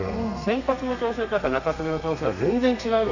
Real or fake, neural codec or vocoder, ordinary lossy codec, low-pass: fake; codec, 32 kHz, 1.9 kbps, SNAC; none; 7.2 kHz